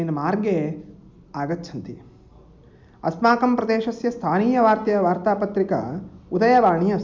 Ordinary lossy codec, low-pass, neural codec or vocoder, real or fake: none; none; none; real